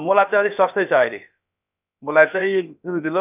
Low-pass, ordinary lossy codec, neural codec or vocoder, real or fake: 3.6 kHz; none; codec, 16 kHz, about 1 kbps, DyCAST, with the encoder's durations; fake